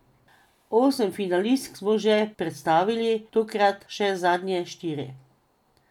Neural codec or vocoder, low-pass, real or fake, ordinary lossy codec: none; 19.8 kHz; real; none